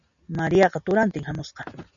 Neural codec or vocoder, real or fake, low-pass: none; real; 7.2 kHz